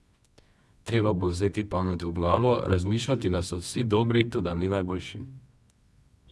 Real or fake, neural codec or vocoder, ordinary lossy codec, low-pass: fake; codec, 24 kHz, 0.9 kbps, WavTokenizer, medium music audio release; none; none